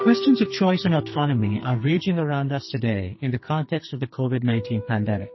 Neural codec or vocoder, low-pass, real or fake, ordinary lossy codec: codec, 44.1 kHz, 2.6 kbps, SNAC; 7.2 kHz; fake; MP3, 24 kbps